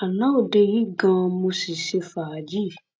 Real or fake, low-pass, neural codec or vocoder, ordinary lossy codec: real; none; none; none